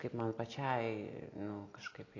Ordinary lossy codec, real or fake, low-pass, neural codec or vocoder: AAC, 32 kbps; real; 7.2 kHz; none